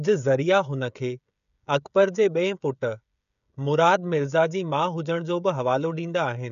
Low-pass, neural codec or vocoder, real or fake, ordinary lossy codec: 7.2 kHz; codec, 16 kHz, 16 kbps, FreqCodec, smaller model; fake; none